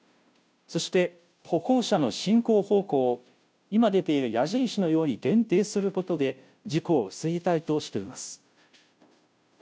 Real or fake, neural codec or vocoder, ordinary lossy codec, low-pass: fake; codec, 16 kHz, 0.5 kbps, FunCodec, trained on Chinese and English, 25 frames a second; none; none